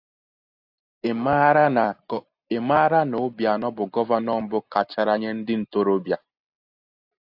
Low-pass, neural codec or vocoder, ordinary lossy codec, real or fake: 5.4 kHz; none; AAC, 48 kbps; real